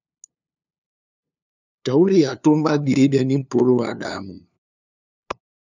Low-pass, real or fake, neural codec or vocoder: 7.2 kHz; fake; codec, 16 kHz, 2 kbps, FunCodec, trained on LibriTTS, 25 frames a second